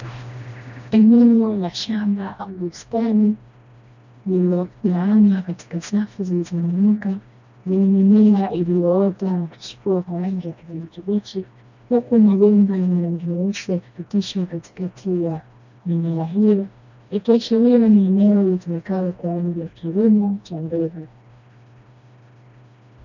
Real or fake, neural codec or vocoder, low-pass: fake; codec, 16 kHz, 1 kbps, FreqCodec, smaller model; 7.2 kHz